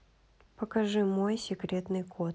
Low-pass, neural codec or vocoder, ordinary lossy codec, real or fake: none; none; none; real